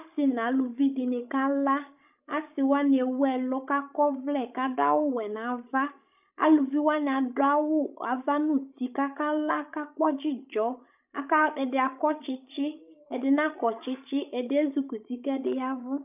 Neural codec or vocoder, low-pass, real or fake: codec, 16 kHz, 16 kbps, FreqCodec, larger model; 3.6 kHz; fake